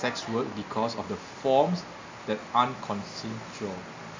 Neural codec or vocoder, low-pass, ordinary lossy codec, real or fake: none; 7.2 kHz; MP3, 64 kbps; real